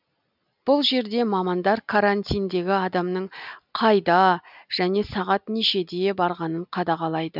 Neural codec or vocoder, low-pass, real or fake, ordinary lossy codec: none; 5.4 kHz; real; none